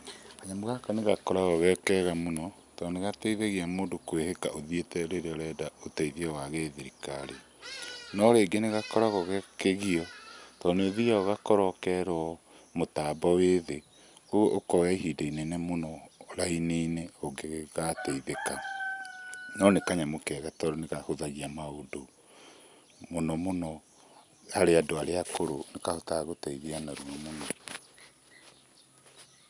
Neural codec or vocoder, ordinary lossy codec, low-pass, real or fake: none; none; 10.8 kHz; real